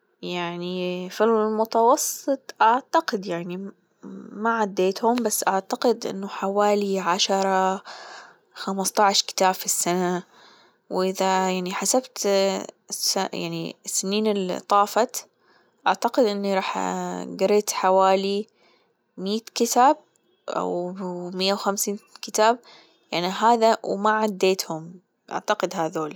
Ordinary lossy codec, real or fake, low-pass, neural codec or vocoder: none; real; none; none